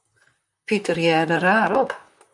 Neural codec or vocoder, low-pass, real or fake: vocoder, 44.1 kHz, 128 mel bands, Pupu-Vocoder; 10.8 kHz; fake